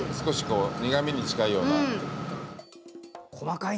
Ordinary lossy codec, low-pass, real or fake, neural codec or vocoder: none; none; real; none